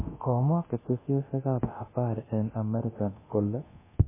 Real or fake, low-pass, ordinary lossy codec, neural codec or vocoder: fake; 3.6 kHz; MP3, 16 kbps; codec, 24 kHz, 0.9 kbps, DualCodec